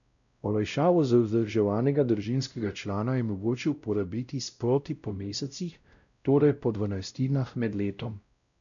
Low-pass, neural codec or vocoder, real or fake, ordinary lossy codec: 7.2 kHz; codec, 16 kHz, 0.5 kbps, X-Codec, WavLM features, trained on Multilingual LibriSpeech; fake; MP3, 96 kbps